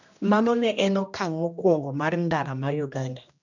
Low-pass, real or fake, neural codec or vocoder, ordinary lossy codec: 7.2 kHz; fake; codec, 16 kHz, 1 kbps, X-Codec, HuBERT features, trained on general audio; none